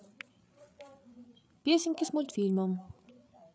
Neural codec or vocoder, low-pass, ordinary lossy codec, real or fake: codec, 16 kHz, 8 kbps, FreqCodec, larger model; none; none; fake